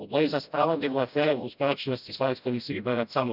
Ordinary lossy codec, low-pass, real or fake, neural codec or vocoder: none; 5.4 kHz; fake; codec, 16 kHz, 0.5 kbps, FreqCodec, smaller model